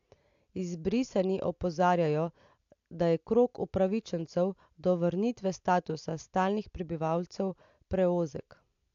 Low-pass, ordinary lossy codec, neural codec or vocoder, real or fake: 7.2 kHz; AAC, 64 kbps; none; real